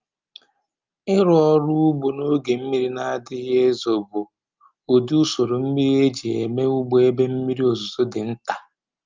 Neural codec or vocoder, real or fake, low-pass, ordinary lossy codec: none; real; 7.2 kHz; Opus, 24 kbps